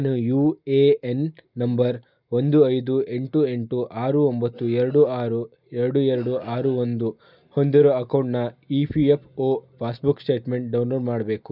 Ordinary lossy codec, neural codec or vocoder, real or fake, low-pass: none; none; real; 5.4 kHz